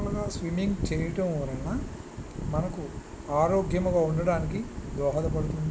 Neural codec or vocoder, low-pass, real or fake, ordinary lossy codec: none; none; real; none